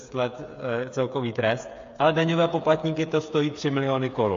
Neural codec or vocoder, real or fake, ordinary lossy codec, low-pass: codec, 16 kHz, 8 kbps, FreqCodec, smaller model; fake; AAC, 48 kbps; 7.2 kHz